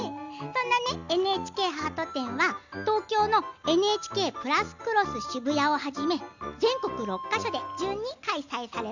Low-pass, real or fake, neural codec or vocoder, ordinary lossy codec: 7.2 kHz; real; none; none